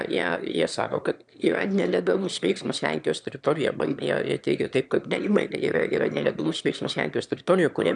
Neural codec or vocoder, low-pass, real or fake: autoencoder, 22.05 kHz, a latent of 192 numbers a frame, VITS, trained on one speaker; 9.9 kHz; fake